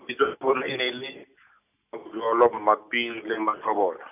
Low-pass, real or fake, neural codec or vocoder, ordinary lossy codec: 3.6 kHz; real; none; none